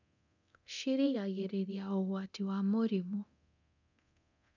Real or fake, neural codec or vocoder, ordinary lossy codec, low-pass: fake; codec, 24 kHz, 0.9 kbps, DualCodec; none; 7.2 kHz